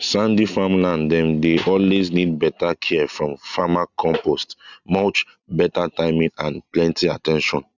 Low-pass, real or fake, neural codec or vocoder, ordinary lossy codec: 7.2 kHz; real; none; none